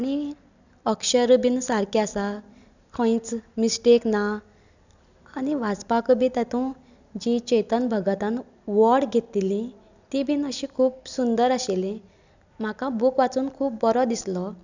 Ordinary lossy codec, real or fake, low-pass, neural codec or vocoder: none; real; 7.2 kHz; none